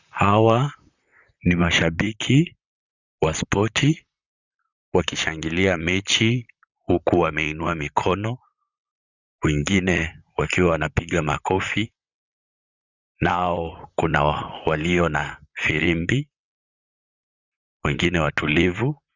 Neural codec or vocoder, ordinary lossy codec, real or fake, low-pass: vocoder, 44.1 kHz, 128 mel bands, Pupu-Vocoder; Opus, 64 kbps; fake; 7.2 kHz